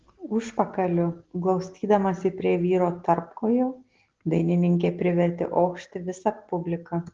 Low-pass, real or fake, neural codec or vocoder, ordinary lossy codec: 7.2 kHz; real; none; Opus, 16 kbps